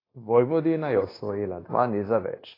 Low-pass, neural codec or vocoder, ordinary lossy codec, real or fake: 5.4 kHz; codec, 16 kHz, 0.9 kbps, LongCat-Audio-Codec; AAC, 24 kbps; fake